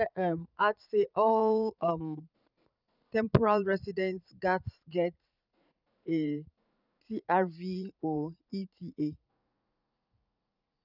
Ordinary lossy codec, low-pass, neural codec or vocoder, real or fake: none; 5.4 kHz; vocoder, 44.1 kHz, 80 mel bands, Vocos; fake